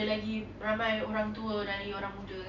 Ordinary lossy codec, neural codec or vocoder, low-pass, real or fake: none; none; 7.2 kHz; real